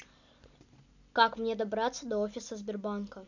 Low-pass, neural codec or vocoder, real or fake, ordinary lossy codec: 7.2 kHz; none; real; none